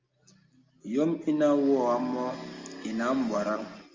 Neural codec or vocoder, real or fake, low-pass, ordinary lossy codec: none; real; 7.2 kHz; Opus, 32 kbps